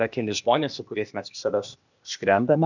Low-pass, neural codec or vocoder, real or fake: 7.2 kHz; codec, 16 kHz, 0.8 kbps, ZipCodec; fake